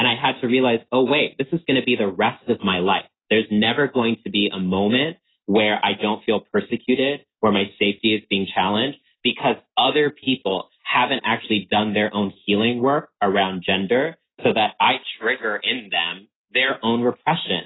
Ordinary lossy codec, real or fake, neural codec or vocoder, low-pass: AAC, 16 kbps; real; none; 7.2 kHz